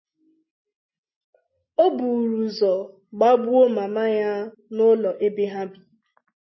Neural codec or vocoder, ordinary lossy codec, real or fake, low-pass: none; MP3, 24 kbps; real; 7.2 kHz